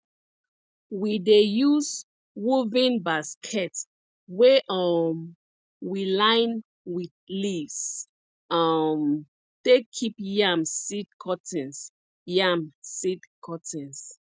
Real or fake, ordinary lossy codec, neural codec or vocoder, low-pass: real; none; none; none